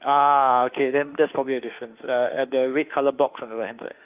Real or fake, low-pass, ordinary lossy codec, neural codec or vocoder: fake; 3.6 kHz; Opus, 32 kbps; autoencoder, 48 kHz, 32 numbers a frame, DAC-VAE, trained on Japanese speech